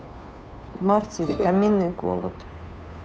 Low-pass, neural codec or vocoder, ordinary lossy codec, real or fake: none; codec, 16 kHz, 2 kbps, FunCodec, trained on Chinese and English, 25 frames a second; none; fake